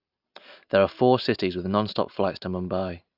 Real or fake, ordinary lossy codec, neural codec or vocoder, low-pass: real; AAC, 48 kbps; none; 5.4 kHz